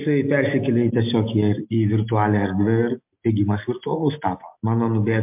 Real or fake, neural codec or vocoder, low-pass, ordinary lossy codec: real; none; 3.6 kHz; MP3, 32 kbps